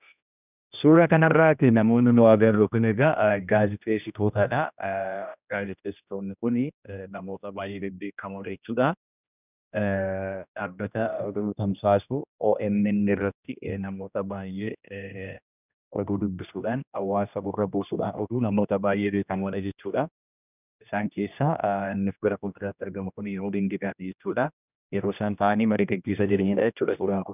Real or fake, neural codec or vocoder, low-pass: fake; codec, 16 kHz, 1 kbps, X-Codec, HuBERT features, trained on general audio; 3.6 kHz